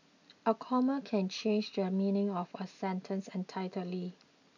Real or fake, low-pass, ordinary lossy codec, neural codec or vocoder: real; 7.2 kHz; none; none